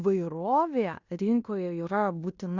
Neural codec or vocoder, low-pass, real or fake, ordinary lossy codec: codec, 16 kHz in and 24 kHz out, 0.9 kbps, LongCat-Audio-Codec, fine tuned four codebook decoder; 7.2 kHz; fake; Opus, 64 kbps